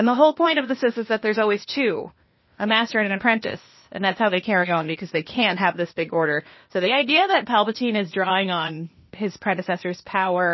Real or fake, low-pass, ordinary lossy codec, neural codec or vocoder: fake; 7.2 kHz; MP3, 24 kbps; codec, 16 kHz, 0.8 kbps, ZipCodec